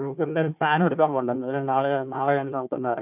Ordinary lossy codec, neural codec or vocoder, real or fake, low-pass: none; codec, 16 kHz, 1 kbps, FunCodec, trained on Chinese and English, 50 frames a second; fake; 3.6 kHz